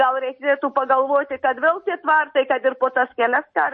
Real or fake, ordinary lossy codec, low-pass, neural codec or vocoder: real; MP3, 32 kbps; 9.9 kHz; none